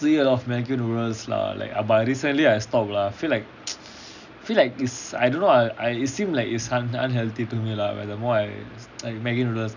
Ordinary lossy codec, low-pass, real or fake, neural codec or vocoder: none; 7.2 kHz; real; none